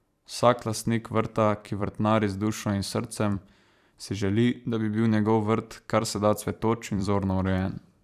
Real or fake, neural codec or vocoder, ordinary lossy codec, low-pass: fake; vocoder, 44.1 kHz, 128 mel bands every 512 samples, BigVGAN v2; none; 14.4 kHz